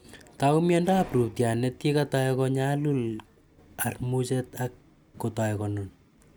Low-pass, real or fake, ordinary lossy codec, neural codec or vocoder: none; real; none; none